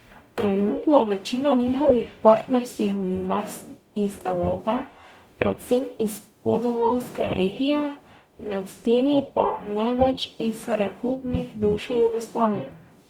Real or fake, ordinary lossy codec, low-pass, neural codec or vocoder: fake; Opus, 64 kbps; 19.8 kHz; codec, 44.1 kHz, 0.9 kbps, DAC